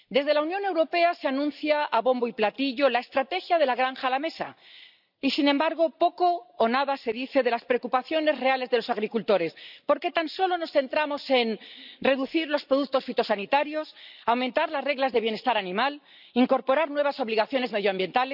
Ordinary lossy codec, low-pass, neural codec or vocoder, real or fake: none; 5.4 kHz; none; real